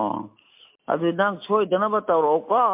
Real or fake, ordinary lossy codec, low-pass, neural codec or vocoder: real; MP3, 32 kbps; 3.6 kHz; none